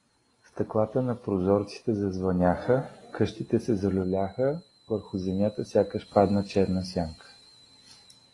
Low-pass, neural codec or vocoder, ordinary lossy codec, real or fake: 10.8 kHz; none; AAC, 32 kbps; real